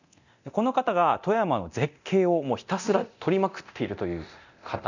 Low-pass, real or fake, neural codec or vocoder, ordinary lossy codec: 7.2 kHz; fake; codec, 24 kHz, 0.9 kbps, DualCodec; none